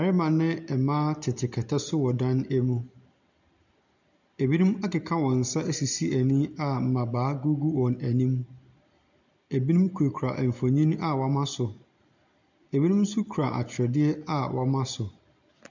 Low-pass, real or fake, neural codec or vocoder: 7.2 kHz; real; none